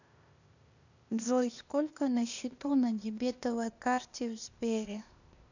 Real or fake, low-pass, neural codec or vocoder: fake; 7.2 kHz; codec, 16 kHz, 0.8 kbps, ZipCodec